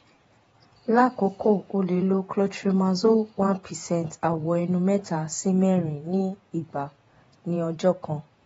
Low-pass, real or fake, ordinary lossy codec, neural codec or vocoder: 19.8 kHz; fake; AAC, 24 kbps; vocoder, 48 kHz, 128 mel bands, Vocos